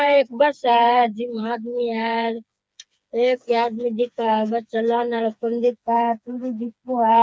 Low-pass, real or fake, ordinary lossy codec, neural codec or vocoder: none; fake; none; codec, 16 kHz, 4 kbps, FreqCodec, smaller model